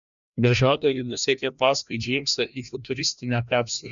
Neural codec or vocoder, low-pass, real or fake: codec, 16 kHz, 1 kbps, FreqCodec, larger model; 7.2 kHz; fake